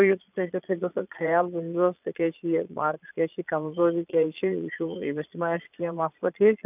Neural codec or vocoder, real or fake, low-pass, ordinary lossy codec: vocoder, 22.05 kHz, 80 mel bands, Vocos; fake; 3.6 kHz; none